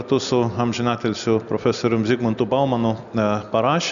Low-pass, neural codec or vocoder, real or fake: 7.2 kHz; none; real